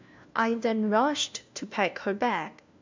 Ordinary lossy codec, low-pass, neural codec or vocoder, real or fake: MP3, 64 kbps; 7.2 kHz; codec, 16 kHz, 1 kbps, FunCodec, trained on LibriTTS, 50 frames a second; fake